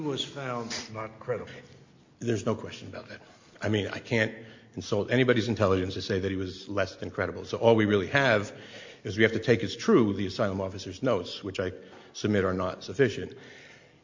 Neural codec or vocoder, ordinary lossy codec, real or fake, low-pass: none; MP3, 48 kbps; real; 7.2 kHz